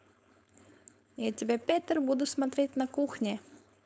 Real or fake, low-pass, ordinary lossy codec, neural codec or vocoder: fake; none; none; codec, 16 kHz, 4.8 kbps, FACodec